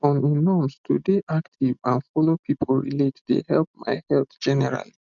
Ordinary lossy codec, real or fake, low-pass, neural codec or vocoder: none; real; 7.2 kHz; none